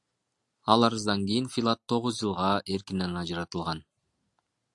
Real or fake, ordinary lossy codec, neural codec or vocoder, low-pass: real; AAC, 64 kbps; none; 10.8 kHz